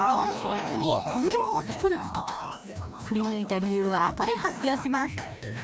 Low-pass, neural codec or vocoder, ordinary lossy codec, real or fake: none; codec, 16 kHz, 1 kbps, FreqCodec, larger model; none; fake